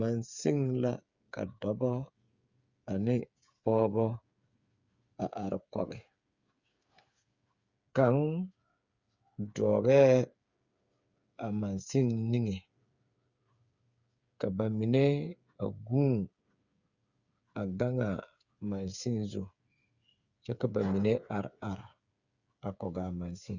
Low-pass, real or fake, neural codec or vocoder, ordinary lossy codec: 7.2 kHz; fake; codec, 16 kHz, 8 kbps, FreqCodec, smaller model; Opus, 64 kbps